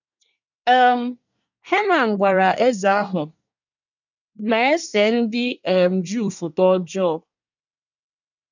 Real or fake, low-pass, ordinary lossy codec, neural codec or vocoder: fake; 7.2 kHz; none; codec, 24 kHz, 1 kbps, SNAC